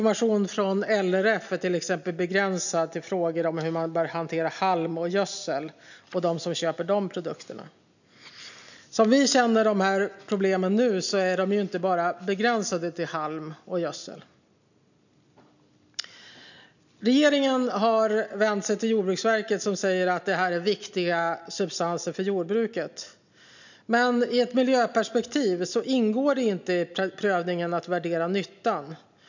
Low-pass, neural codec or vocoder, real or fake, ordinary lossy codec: 7.2 kHz; none; real; none